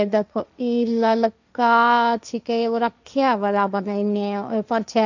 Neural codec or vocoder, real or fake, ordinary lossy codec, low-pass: codec, 16 kHz, 1.1 kbps, Voila-Tokenizer; fake; none; 7.2 kHz